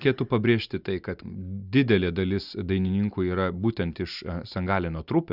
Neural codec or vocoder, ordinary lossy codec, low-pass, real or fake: none; Opus, 64 kbps; 5.4 kHz; real